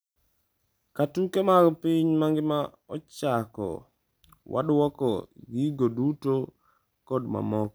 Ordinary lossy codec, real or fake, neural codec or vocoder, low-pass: none; real; none; none